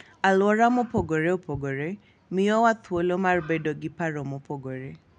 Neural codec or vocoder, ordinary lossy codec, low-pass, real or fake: none; none; 9.9 kHz; real